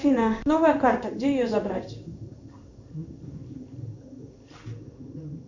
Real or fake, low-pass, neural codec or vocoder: fake; 7.2 kHz; codec, 16 kHz in and 24 kHz out, 1 kbps, XY-Tokenizer